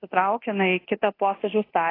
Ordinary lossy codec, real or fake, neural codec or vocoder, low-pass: AAC, 24 kbps; fake; codec, 24 kHz, 0.9 kbps, DualCodec; 5.4 kHz